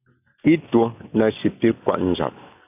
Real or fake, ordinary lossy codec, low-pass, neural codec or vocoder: real; AAC, 32 kbps; 3.6 kHz; none